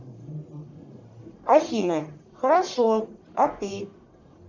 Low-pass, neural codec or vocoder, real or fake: 7.2 kHz; codec, 44.1 kHz, 1.7 kbps, Pupu-Codec; fake